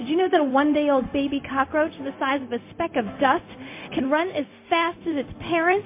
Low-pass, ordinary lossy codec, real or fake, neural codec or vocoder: 3.6 kHz; MP3, 24 kbps; fake; codec, 16 kHz, 0.4 kbps, LongCat-Audio-Codec